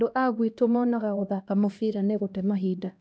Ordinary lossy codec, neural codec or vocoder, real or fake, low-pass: none; codec, 16 kHz, 1 kbps, X-Codec, HuBERT features, trained on LibriSpeech; fake; none